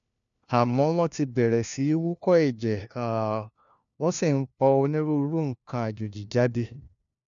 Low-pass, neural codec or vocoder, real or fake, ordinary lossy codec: 7.2 kHz; codec, 16 kHz, 1 kbps, FunCodec, trained on LibriTTS, 50 frames a second; fake; none